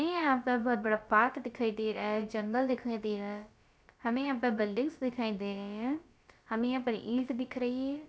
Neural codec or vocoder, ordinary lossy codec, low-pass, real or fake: codec, 16 kHz, about 1 kbps, DyCAST, with the encoder's durations; none; none; fake